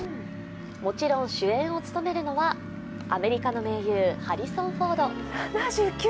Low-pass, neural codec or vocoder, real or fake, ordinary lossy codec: none; none; real; none